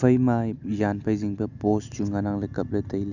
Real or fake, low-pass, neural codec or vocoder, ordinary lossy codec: real; 7.2 kHz; none; none